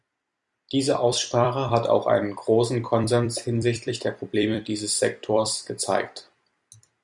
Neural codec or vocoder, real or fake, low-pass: vocoder, 44.1 kHz, 128 mel bands every 256 samples, BigVGAN v2; fake; 10.8 kHz